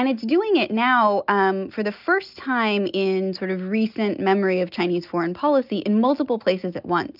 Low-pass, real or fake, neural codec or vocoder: 5.4 kHz; real; none